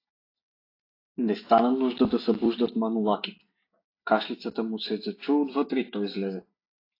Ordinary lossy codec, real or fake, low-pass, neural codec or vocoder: AAC, 32 kbps; real; 5.4 kHz; none